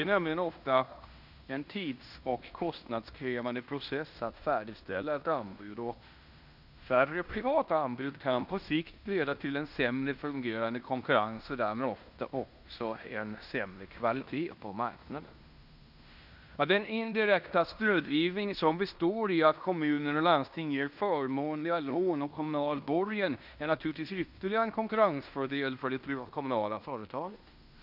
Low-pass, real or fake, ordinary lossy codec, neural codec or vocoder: 5.4 kHz; fake; none; codec, 16 kHz in and 24 kHz out, 0.9 kbps, LongCat-Audio-Codec, fine tuned four codebook decoder